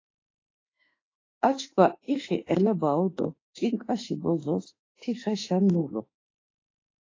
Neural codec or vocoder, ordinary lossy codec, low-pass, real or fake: autoencoder, 48 kHz, 32 numbers a frame, DAC-VAE, trained on Japanese speech; AAC, 32 kbps; 7.2 kHz; fake